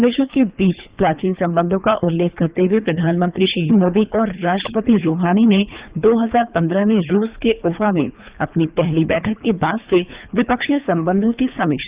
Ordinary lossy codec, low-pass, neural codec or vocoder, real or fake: Opus, 64 kbps; 3.6 kHz; codec, 24 kHz, 3 kbps, HILCodec; fake